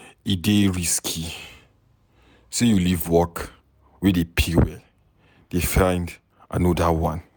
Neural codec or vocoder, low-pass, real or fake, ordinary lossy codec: none; none; real; none